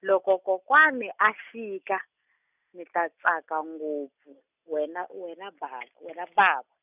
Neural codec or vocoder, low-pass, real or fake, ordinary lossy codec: none; 3.6 kHz; real; none